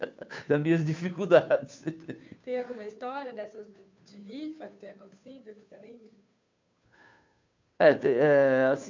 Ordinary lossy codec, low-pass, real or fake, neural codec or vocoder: MP3, 64 kbps; 7.2 kHz; fake; codec, 16 kHz, 2 kbps, FunCodec, trained on Chinese and English, 25 frames a second